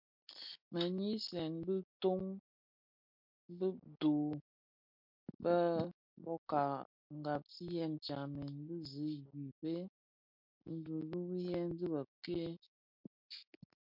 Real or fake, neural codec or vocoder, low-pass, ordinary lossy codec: real; none; 5.4 kHz; MP3, 48 kbps